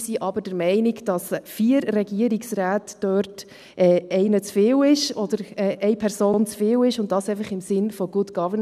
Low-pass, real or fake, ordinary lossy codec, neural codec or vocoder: 14.4 kHz; real; none; none